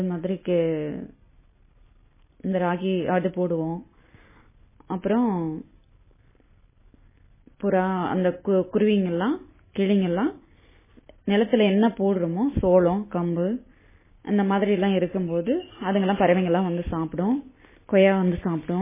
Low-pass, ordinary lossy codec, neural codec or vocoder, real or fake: 3.6 kHz; MP3, 16 kbps; none; real